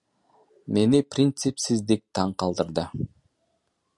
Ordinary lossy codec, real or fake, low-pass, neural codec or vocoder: MP3, 96 kbps; real; 10.8 kHz; none